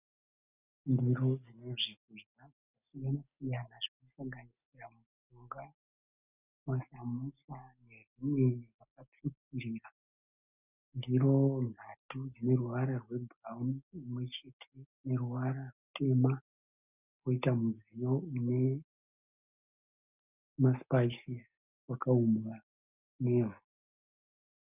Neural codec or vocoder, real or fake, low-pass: none; real; 3.6 kHz